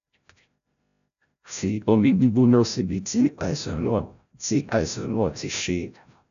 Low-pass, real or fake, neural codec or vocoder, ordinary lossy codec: 7.2 kHz; fake; codec, 16 kHz, 0.5 kbps, FreqCodec, larger model; none